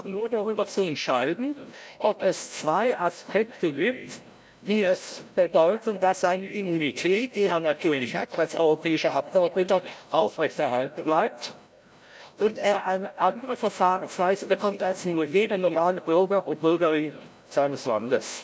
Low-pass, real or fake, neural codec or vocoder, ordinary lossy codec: none; fake; codec, 16 kHz, 0.5 kbps, FreqCodec, larger model; none